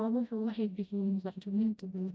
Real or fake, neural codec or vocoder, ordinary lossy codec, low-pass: fake; codec, 16 kHz, 0.5 kbps, FreqCodec, smaller model; none; none